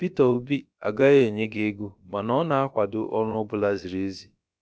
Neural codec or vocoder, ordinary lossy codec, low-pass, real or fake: codec, 16 kHz, about 1 kbps, DyCAST, with the encoder's durations; none; none; fake